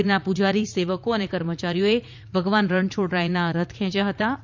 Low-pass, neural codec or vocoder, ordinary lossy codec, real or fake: 7.2 kHz; vocoder, 44.1 kHz, 80 mel bands, Vocos; MP3, 64 kbps; fake